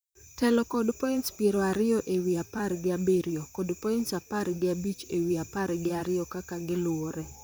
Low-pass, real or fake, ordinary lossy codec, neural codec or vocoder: none; fake; none; vocoder, 44.1 kHz, 128 mel bands, Pupu-Vocoder